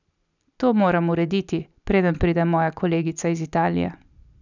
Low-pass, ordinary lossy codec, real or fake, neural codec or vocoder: 7.2 kHz; none; real; none